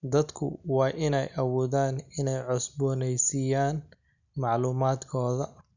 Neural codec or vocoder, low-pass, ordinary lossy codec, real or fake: none; 7.2 kHz; none; real